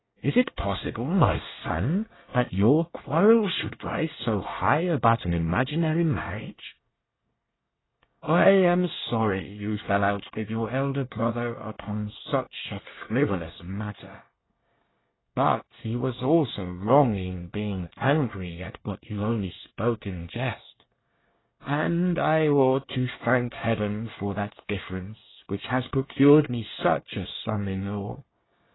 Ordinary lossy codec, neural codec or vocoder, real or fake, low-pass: AAC, 16 kbps; codec, 24 kHz, 1 kbps, SNAC; fake; 7.2 kHz